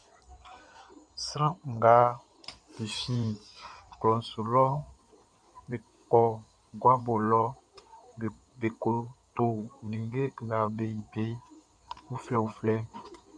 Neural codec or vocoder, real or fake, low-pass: codec, 16 kHz in and 24 kHz out, 2.2 kbps, FireRedTTS-2 codec; fake; 9.9 kHz